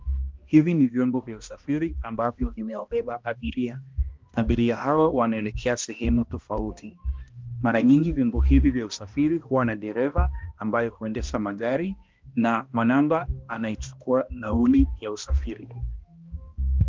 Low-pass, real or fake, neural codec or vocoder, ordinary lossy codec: 7.2 kHz; fake; codec, 16 kHz, 1 kbps, X-Codec, HuBERT features, trained on balanced general audio; Opus, 32 kbps